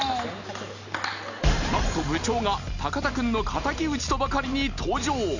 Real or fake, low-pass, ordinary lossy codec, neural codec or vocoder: real; 7.2 kHz; none; none